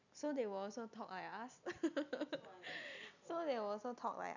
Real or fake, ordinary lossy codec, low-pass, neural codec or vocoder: real; none; 7.2 kHz; none